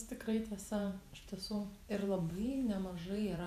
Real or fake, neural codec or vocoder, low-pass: fake; vocoder, 48 kHz, 128 mel bands, Vocos; 14.4 kHz